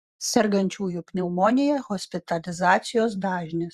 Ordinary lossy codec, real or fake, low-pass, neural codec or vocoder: Opus, 64 kbps; fake; 14.4 kHz; vocoder, 44.1 kHz, 128 mel bands every 512 samples, BigVGAN v2